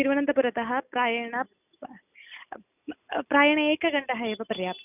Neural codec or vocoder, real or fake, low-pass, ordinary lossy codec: none; real; 3.6 kHz; none